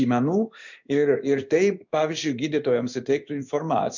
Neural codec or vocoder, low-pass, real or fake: codec, 16 kHz in and 24 kHz out, 1 kbps, XY-Tokenizer; 7.2 kHz; fake